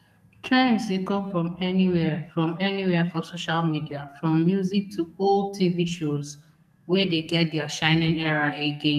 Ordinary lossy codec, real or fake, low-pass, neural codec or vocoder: none; fake; 14.4 kHz; codec, 44.1 kHz, 2.6 kbps, SNAC